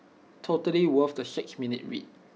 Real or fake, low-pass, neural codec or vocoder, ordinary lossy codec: real; none; none; none